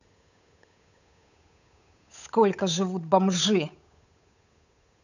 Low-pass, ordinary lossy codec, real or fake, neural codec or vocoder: 7.2 kHz; none; fake; codec, 16 kHz, 8 kbps, FunCodec, trained on Chinese and English, 25 frames a second